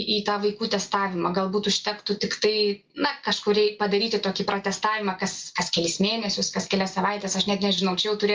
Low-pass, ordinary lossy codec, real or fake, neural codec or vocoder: 7.2 kHz; Opus, 24 kbps; real; none